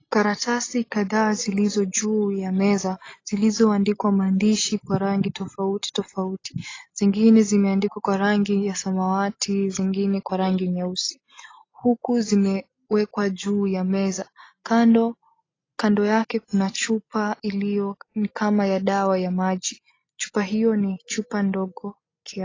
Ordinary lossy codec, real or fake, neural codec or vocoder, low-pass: AAC, 32 kbps; real; none; 7.2 kHz